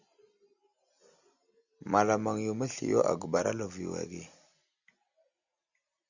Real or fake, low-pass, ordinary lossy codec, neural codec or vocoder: real; 7.2 kHz; Opus, 64 kbps; none